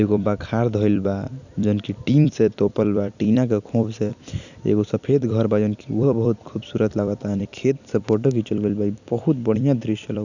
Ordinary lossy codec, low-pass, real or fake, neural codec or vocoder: none; 7.2 kHz; fake; vocoder, 44.1 kHz, 128 mel bands every 256 samples, BigVGAN v2